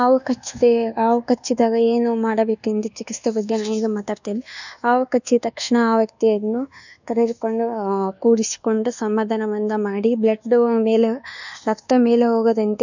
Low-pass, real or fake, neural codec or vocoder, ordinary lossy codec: 7.2 kHz; fake; codec, 24 kHz, 1.2 kbps, DualCodec; none